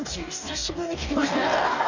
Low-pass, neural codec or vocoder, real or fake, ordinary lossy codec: 7.2 kHz; codec, 44.1 kHz, 2.6 kbps, DAC; fake; none